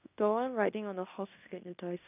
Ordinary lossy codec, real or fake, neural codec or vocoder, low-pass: none; fake; codec, 16 kHz in and 24 kHz out, 0.9 kbps, LongCat-Audio-Codec, fine tuned four codebook decoder; 3.6 kHz